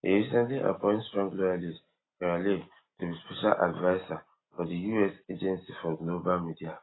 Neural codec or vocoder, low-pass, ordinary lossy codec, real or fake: none; 7.2 kHz; AAC, 16 kbps; real